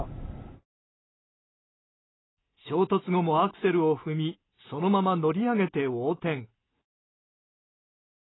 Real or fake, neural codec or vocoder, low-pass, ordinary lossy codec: real; none; 7.2 kHz; AAC, 16 kbps